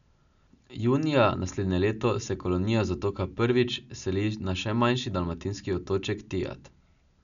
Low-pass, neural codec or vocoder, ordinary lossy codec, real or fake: 7.2 kHz; none; none; real